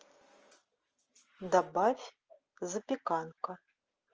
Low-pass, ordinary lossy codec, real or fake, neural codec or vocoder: 7.2 kHz; Opus, 24 kbps; real; none